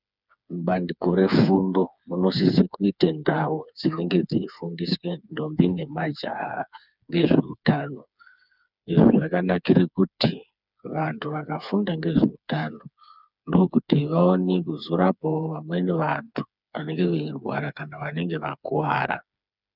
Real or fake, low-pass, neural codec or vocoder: fake; 5.4 kHz; codec, 16 kHz, 4 kbps, FreqCodec, smaller model